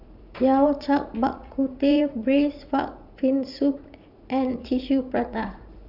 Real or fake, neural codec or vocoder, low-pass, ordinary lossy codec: fake; vocoder, 44.1 kHz, 80 mel bands, Vocos; 5.4 kHz; none